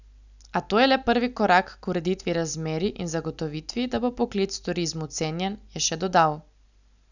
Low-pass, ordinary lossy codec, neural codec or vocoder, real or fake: 7.2 kHz; none; none; real